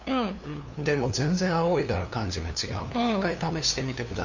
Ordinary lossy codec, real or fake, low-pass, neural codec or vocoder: Opus, 64 kbps; fake; 7.2 kHz; codec, 16 kHz, 2 kbps, FunCodec, trained on LibriTTS, 25 frames a second